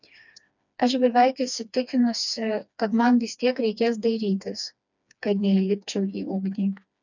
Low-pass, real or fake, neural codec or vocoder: 7.2 kHz; fake; codec, 16 kHz, 2 kbps, FreqCodec, smaller model